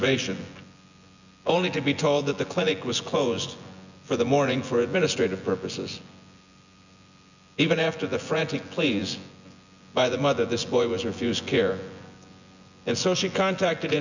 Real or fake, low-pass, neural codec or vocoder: fake; 7.2 kHz; vocoder, 24 kHz, 100 mel bands, Vocos